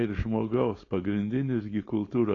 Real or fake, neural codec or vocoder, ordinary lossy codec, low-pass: fake; codec, 16 kHz, 4.8 kbps, FACodec; AAC, 32 kbps; 7.2 kHz